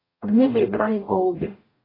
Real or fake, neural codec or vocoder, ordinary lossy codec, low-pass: fake; codec, 44.1 kHz, 0.9 kbps, DAC; AAC, 24 kbps; 5.4 kHz